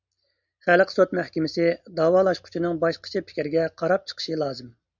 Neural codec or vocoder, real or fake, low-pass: none; real; 7.2 kHz